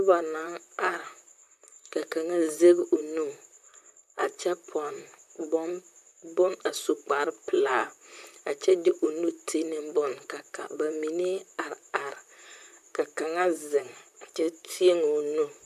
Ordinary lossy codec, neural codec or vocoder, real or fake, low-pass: MP3, 96 kbps; vocoder, 44.1 kHz, 128 mel bands, Pupu-Vocoder; fake; 14.4 kHz